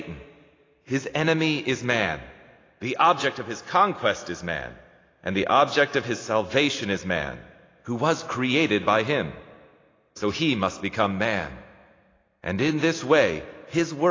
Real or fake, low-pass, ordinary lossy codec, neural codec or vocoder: real; 7.2 kHz; AAC, 32 kbps; none